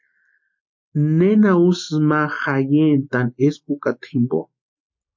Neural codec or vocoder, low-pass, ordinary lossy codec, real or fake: none; 7.2 kHz; MP3, 48 kbps; real